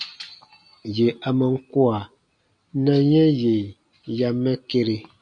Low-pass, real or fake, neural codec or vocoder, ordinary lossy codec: 9.9 kHz; real; none; AAC, 64 kbps